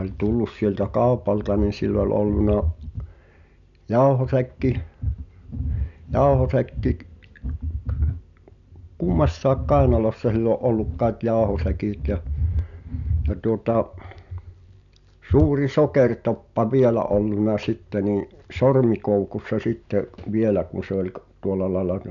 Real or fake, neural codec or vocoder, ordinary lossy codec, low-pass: real; none; none; 7.2 kHz